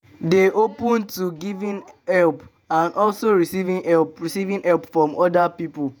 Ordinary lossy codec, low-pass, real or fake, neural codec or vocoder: none; none; fake; vocoder, 48 kHz, 128 mel bands, Vocos